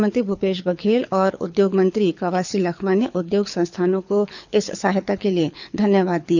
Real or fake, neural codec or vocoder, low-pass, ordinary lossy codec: fake; codec, 24 kHz, 6 kbps, HILCodec; 7.2 kHz; none